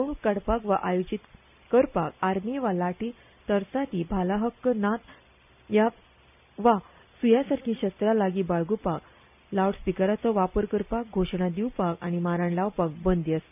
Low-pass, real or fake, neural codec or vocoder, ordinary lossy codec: 3.6 kHz; real; none; none